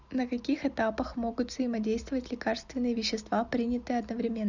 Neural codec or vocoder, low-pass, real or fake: none; 7.2 kHz; real